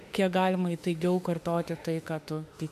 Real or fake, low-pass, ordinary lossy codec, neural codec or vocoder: fake; 14.4 kHz; AAC, 96 kbps; autoencoder, 48 kHz, 32 numbers a frame, DAC-VAE, trained on Japanese speech